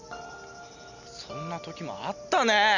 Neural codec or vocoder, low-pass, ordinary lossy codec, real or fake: none; 7.2 kHz; none; real